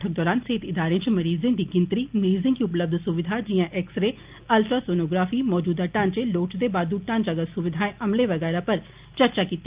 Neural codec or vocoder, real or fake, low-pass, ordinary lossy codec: none; real; 3.6 kHz; Opus, 24 kbps